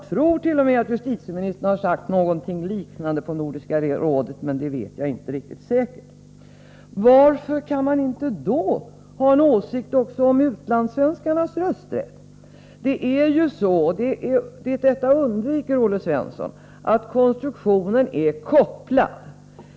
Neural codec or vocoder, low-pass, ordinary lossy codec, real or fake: none; none; none; real